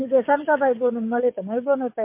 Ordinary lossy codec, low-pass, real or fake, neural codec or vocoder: MP3, 24 kbps; 3.6 kHz; fake; codec, 44.1 kHz, 7.8 kbps, DAC